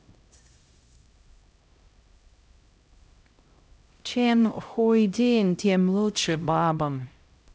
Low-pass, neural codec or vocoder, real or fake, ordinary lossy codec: none; codec, 16 kHz, 0.5 kbps, X-Codec, HuBERT features, trained on LibriSpeech; fake; none